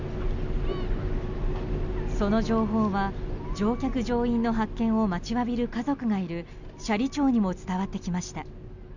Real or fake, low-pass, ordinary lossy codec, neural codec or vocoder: real; 7.2 kHz; none; none